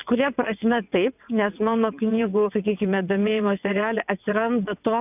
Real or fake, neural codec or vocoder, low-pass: fake; vocoder, 22.05 kHz, 80 mel bands, WaveNeXt; 3.6 kHz